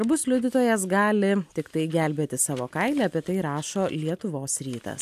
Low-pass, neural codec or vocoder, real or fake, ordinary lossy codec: 14.4 kHz; none; real; AAC, 96 kbps